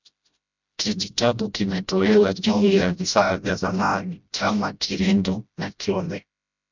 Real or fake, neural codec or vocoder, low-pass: fake; codec, 16 kHz, 0.5 kbps, FreqCodec, smaller model; 7.2 kHz